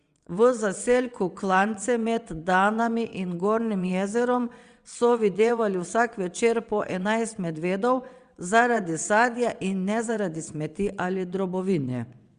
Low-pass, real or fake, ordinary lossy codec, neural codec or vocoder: 9.9 kHz; fake; Opus, 64 kbps; vocoder, 22.05 kHz, 80 mel bands, WaveNeXt